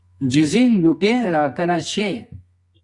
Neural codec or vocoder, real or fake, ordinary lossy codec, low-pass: codec, 24 kHz, 0.9 kbps, WavTokenizer, medium music audio release; fake; Opus, 64 kbps; 10.8 kHz